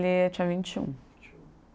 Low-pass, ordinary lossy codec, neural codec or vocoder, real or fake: none; none; none; real